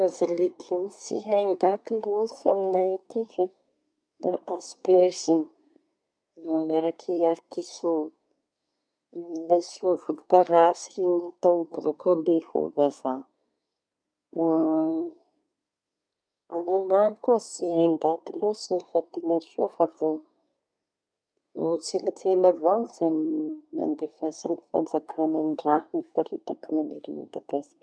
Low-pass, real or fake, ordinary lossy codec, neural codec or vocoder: 9.9 kHz; fake; none; codec, 24 kHz, 1 kbps, SNAC